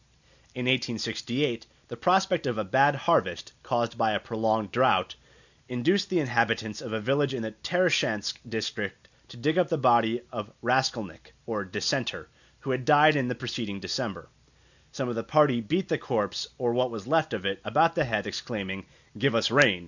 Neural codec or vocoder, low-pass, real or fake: none; 7.2 kHz; real